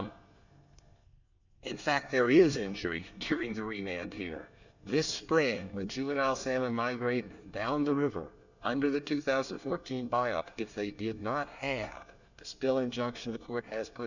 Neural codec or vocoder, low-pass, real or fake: codec, 24 kHz, 1 kbps, SNAC; 7.2 kHz; fake